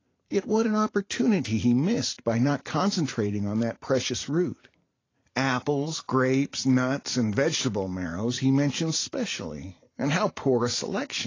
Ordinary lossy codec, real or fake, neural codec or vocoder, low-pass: AAC, 32 kbps; fake; vocoder, 44.1 kHz, 128 mel bands every 512 samples, BigVGAN v2; 7.2 kHz